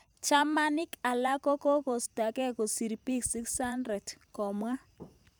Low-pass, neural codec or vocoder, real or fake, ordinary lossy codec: none; vocoder, 44.1 kHz, 128 mel bands every 512 samples, BigVGAN v2; fake; none